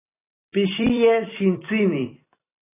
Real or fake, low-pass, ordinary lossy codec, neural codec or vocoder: fake; 3.6 kHz; AAC, 24 kbps; vocoder, 44.1 kHz, 128 mel bands every 256 samples, BigVGAN v2